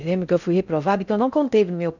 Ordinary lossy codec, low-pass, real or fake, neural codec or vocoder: none; 7.2 kHz; fake; codec, 16 kHz in and 24 kHz out, 0.6 kbps, FocalCodec, streaming, 4096 codes